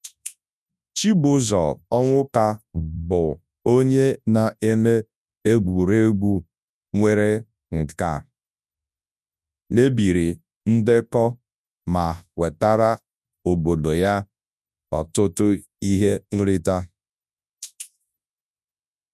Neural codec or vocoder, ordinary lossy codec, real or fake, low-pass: codec, 24 kHz, 0.9 kbps, WavTokenizer, large speech release; none; fake; none